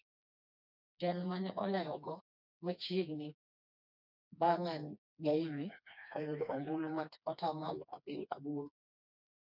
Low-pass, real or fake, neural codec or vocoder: 5.4 kHz; fake; codec, 16 kHz, 2 kbps, FreqCodec, smaller model